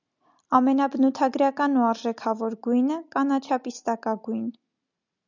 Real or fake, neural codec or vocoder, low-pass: real; none; 7.2 kHz